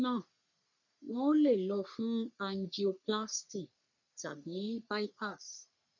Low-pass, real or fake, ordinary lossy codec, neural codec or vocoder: 7.2 kHz; fake; none; codec, 44.1 kHz, 3.4 kbps, Pupu-Codec